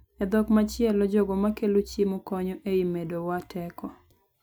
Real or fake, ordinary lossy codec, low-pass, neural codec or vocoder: real; none; none; none